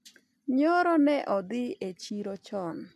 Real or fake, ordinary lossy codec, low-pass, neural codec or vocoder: real; MP3, 96 kbps; 14.4 kHz; none